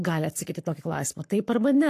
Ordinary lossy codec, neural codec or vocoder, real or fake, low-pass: AAC, 64 kbps; codec, 44.1 kHz, 7.8 kbps, Pupu-Codec; fake; 14.4 kHz